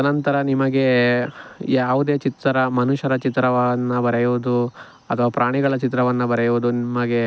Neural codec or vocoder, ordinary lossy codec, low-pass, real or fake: none; none; none; real